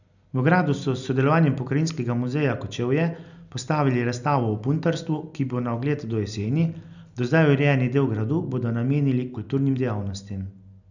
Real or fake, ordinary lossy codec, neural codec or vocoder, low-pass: real; none; none; 7.2 kHz